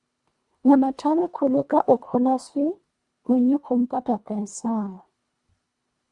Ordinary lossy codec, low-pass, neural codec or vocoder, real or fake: Opus, 64 kbps; 10.8 kHz; codec, 24 kHz, 1.5 kbps, HILCodec; fake